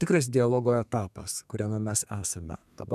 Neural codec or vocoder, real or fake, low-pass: codec, 32 kHz, 1.9 kbps, SNAC; fake; 14.4 kHz